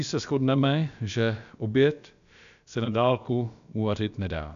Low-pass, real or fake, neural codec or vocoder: 7.2 kHz; fake; codec, 16 kHz, about 1 kbps, DyCAST, with the encoder's durations